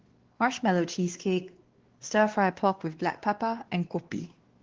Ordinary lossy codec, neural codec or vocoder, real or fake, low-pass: Opus, 16 kbps; codec, 16 kHz, 2 kbps, X-Codec, WavLM features, trained on Multilingual LibriSpeech; fake; 7.2 kHz